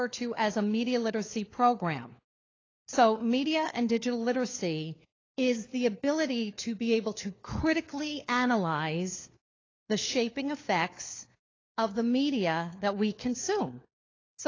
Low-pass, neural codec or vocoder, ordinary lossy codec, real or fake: 7.2 kHz; codec, 16 kHz, 4 kbps, FunCodec, trained on LibriTTS, 50 frames a second; AAC, 32 kbps; fake